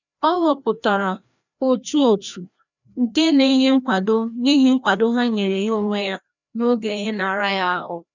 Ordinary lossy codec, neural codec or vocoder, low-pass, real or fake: none; codec, 16 kHz, 1 kbps, FreqCodec, larger model; 7.2 kHz; fake